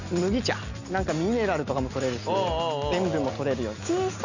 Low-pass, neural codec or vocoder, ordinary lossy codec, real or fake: 7.2 kHz; none; none; real